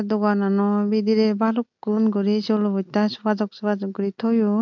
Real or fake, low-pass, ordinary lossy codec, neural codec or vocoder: real; 7.2 kHz; MP3, 64 kbps; none